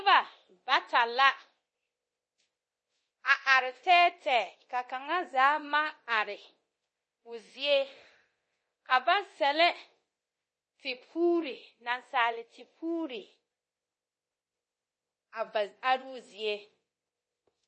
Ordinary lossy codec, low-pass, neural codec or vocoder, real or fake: MP3, 32 kbps; 10.8 kHz; codec, 24 kHz, 0.9 kbps, DualCodec; fake